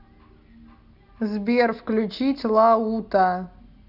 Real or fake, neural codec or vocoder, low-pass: real; none; 5.4 kHz